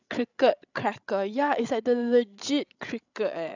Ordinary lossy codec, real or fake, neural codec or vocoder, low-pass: none; fake; codec, 16 kHz, 16 kbps, FreqCodec, larger model; 7.2 kHz